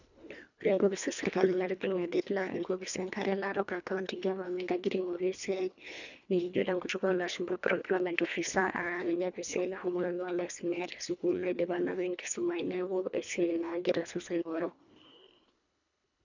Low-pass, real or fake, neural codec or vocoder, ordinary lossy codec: 7.2 kHz; fake; codec, 24 kHz, 1.5 kbps, HILCodec; none